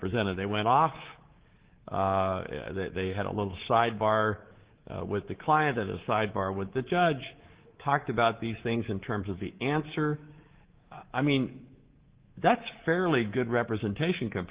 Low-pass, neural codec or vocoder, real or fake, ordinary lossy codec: 3.6 kHz; codec, 24 kHz, 3.1 kbps, DualCodec; fake; Opus, 16 kbps